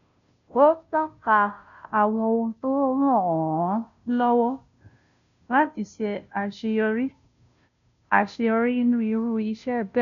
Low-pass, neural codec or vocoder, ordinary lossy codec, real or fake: 7.2 kHz; codec, 16 kHz, 0.5 kbps, FunCodec, trained on Chinese and English, 25 frames a second; none; fake